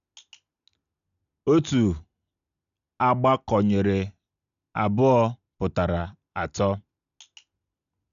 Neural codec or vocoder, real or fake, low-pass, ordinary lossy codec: none; real; 7.2 kHz; none